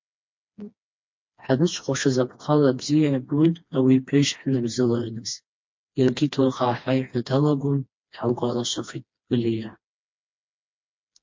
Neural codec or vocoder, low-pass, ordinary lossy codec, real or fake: codec, 16 kHz, 2 kbps, FreqCodec, smaller model; 7.2 kHz; MP3, 48 kbps; fake